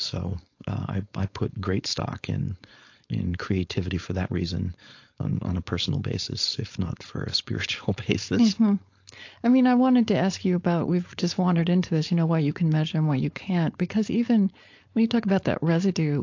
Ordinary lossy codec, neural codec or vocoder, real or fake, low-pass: AAC, 48 kbps; codec, 16 kHz, 4.8 kbps, FACodec; fake; 7.2 kHz